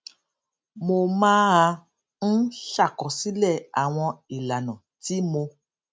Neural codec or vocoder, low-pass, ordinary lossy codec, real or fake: none; none; none; real